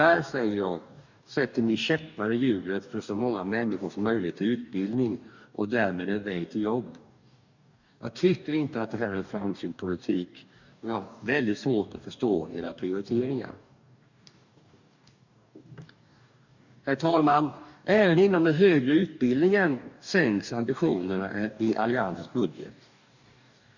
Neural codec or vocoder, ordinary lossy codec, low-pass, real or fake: codec, 44.1 kHz, 2.6 kbps, DAC; none; 7.2 kHz; fake